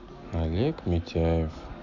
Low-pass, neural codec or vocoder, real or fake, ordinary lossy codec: 7.2 kHz; none; real; MP3, 48 kbps